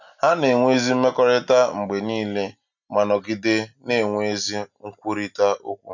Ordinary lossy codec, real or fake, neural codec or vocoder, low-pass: none; real; none; 7.2 kHz